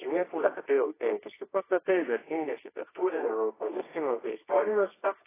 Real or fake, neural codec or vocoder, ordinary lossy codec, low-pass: fake; codec, 24 kHz, 0.9 kbps, WavTokenizer, medium music audio release; AAC, 16 kbps; 3.6 kHz